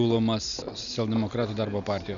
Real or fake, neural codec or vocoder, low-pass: real; none; 7.2 kHz